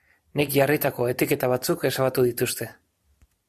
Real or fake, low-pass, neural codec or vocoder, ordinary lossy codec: fake; 14.4 kHz; vocoder, 44.1 kHz, 128 mel bands every 256 samples, BigVGAN v2; AAC, 96 kbps